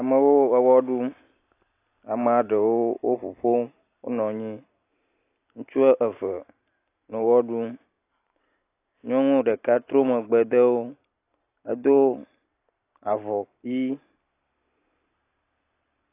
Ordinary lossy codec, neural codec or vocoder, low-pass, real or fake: AAC, 24 kbps; none; 3.6 kHz; real